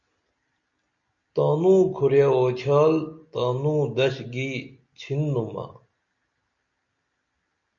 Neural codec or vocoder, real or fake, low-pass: none; real; 7.2 kHz